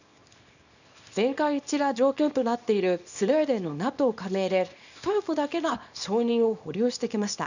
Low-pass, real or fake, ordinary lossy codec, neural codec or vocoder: 7.2 kHz; fake; none; codec, 24 kHz, 0.9 kbps, WavTokenizer, small release